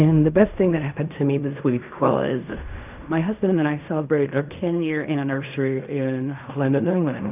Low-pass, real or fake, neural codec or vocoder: 3.6 kHz; fake; codec, 16 kHz in and 24 kHz out, 0.4 kbps, LongCat-Audio-Codec, fine tuned four codebook decoder